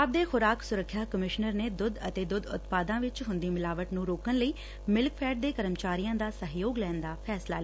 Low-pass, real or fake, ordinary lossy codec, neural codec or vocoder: none; real; none; none